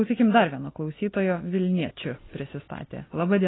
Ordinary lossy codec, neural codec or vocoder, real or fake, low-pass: AAC, 16 kbps; none; real; 7.2 kHz